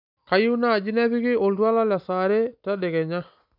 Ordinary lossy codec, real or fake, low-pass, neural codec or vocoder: none; real; 5.4 kHz; none